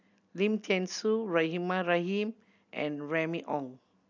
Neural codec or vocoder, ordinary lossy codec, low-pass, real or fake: none; none; 7.2 kHz; real